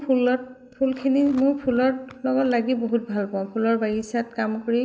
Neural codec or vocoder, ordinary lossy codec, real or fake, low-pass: none; none; real; none